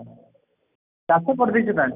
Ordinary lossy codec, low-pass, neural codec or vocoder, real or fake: none; 3.6 kHz; none; real